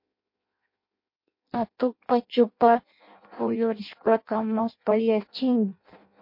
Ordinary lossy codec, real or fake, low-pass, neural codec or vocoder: MP3, 32 kbps; fake; 5.4 kHz; codec, 16 kHz in and 24 kHz out, 0.6 kbps, FireRedTTS-2 codec